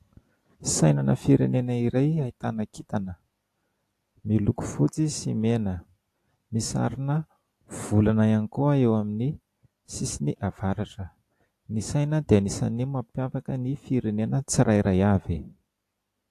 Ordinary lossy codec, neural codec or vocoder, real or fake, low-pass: AAC, 64 kbps; none; real; 14.4 kHz